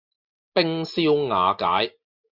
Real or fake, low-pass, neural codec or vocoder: real; 5.4 kHz; none